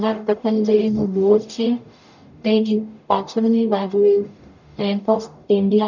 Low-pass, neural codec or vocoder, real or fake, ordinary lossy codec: 7.2 kHz; codec, 44.1 kHz, 0.9 kbps, DAC; fake; none